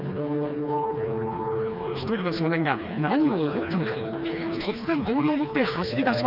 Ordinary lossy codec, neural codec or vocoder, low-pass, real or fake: none; codec, 16 kHz, 2 kbps, FreqCodec, smaller model; 5.4 kHz; fake